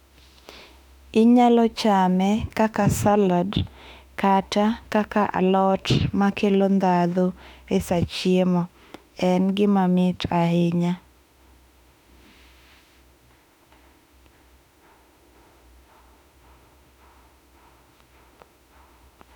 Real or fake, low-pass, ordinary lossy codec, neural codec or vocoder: fake; 19.8 kHz; none; autoencoder, 48 kHz, 32 numbers a frame, DAC-VAE, trained on Japanese speech